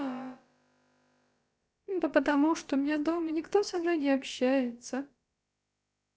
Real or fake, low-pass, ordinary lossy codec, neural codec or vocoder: fake; none; none; codec, 16 kHz, about 1 kbps, DyCAST, with the encoder's durations